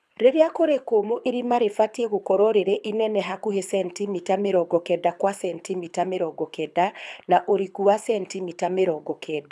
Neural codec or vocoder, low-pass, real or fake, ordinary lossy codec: codec, 24 kHz, 6 kbps, HILCodec; none; fake; none